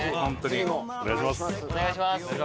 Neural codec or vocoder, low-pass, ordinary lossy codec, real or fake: none; none; none; real